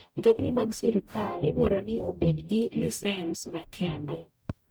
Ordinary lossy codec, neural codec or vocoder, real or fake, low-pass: none; codec, 44.1 kHz, 0.9 kbps, DAC; fake; none